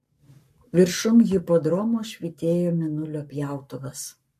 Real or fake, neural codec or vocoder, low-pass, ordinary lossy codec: fake; codec, 44.1 kHz, 7.8 kbps, Pupu-Codec; 14.4 kHz; MP3, 64 kbps